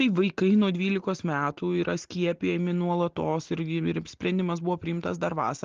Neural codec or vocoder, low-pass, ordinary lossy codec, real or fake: none; 7.2 kHz; Opus, 16 kbps; real